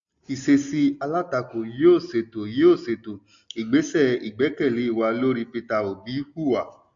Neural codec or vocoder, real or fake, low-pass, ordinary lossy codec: none; real; 7.2 kHz; AAC, 64 kbps